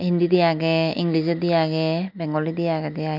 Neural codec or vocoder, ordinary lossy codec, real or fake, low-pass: none; none; real; 5.4 kHz